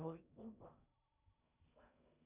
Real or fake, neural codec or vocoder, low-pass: fake; codec, 16 kHz in and 24 kHz out, 0.6 kbps, FocalCodec, streaming, 4096 codes; 3.6 kHz